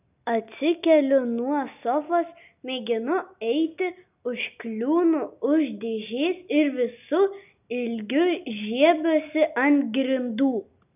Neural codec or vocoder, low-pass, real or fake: none; 3.6 kHz; real